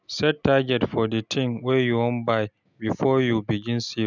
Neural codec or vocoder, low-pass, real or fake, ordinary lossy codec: none; 7.2 kHz; real; none